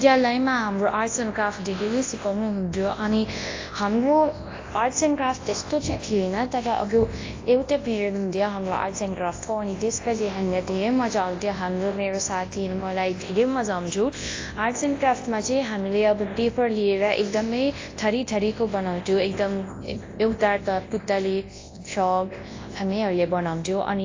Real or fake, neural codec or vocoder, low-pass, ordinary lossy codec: fake; codec, 24 kHz, 0.9 kbps, WavTokenizer, large speech release; 7.2 kHz; AAC, 32 kbps